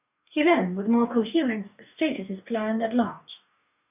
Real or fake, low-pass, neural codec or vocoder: fake; 3.6 kHz; codec, 44.1 kHz, 2.6 kbps, DAC